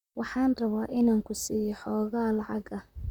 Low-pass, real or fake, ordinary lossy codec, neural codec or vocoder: 19.8 kHz; fake; none; vocoder, 44.1 kHz, 128 mel bands, Pupu-Vocoder